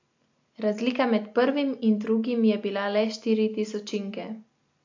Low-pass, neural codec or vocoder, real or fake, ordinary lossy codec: 7.2 kHz; none; real; AAC, 48 kbps